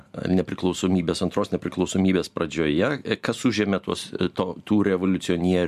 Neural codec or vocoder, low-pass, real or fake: none; 14.4 kHz; real